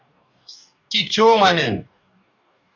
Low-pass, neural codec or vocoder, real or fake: 7.2 kHz; codec, 44.1 kHz, 2.6 kbps, DAC; fake